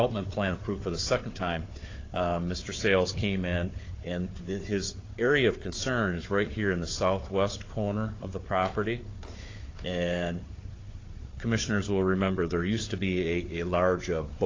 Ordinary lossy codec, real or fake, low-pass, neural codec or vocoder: AAC, 32 kbps; fake; 7.2 kHz; codec, 16 kHz, 4 kbps, FunCodec, trained on Chinese and English, 50 frames a second